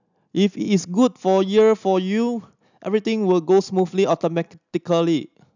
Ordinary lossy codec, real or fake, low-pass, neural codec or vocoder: none; real; 7.2 kHz; none